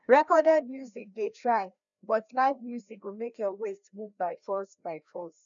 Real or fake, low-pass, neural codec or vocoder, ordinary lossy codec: fake; 7.2 kHz; codec, 16 kHz, 1 kbps, FreqCodec, larger model; none